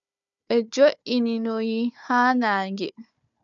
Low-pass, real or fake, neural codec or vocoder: 7.2 kHz; fake; codec, 16 kHz, 4 kbps, FunCodec, trained on Chinese and English, 50 frames a second